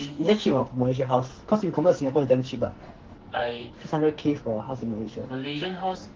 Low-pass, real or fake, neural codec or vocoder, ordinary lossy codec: 7.2 kHz; fake; codec, 44.1 kHz, 2.6 kbps, SNAC; Opus, 16 kbps